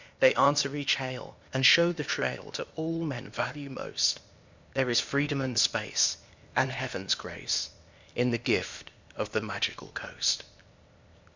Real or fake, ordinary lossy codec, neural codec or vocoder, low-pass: fake; Opus, 64 kbps; codec, 16 kHz, 0.8 kbps, ZipCodec; 7.2 kHz